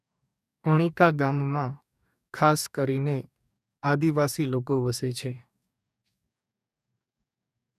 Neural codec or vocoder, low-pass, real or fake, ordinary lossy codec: codec, 44.1 kHz, 2.6 kbps, DAC; 14.4 kHz; fake; none